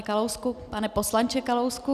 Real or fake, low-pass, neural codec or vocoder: real; 14.4 kHz; none